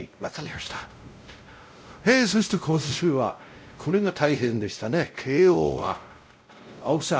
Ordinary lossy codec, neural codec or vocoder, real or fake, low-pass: none; codec, 16 kHz, 0.5 kbps, X-Codec, WavLM features, trained on Multilingual LibriSpeech; fake; none